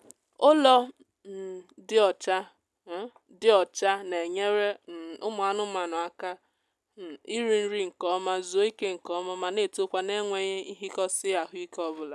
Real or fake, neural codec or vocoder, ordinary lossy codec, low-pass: real; none; none; none